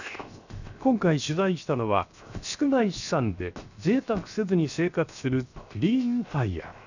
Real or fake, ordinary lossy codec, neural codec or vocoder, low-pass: fake; none; codec, 16 kHz, 0.7 kbps, FocalCodec; 7.2 kHz